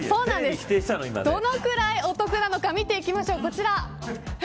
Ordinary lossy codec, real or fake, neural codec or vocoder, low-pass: none; real; none; none